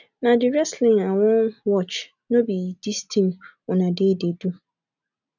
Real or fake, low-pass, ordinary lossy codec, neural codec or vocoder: real; 7.2 kHz; none; none